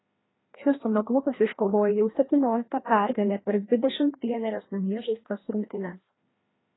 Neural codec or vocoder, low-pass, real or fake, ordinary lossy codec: codec, 16 kHz, 1 kbps, FreqCodec, larger model; 7.2 kHz; fake; AAC, 16 kbps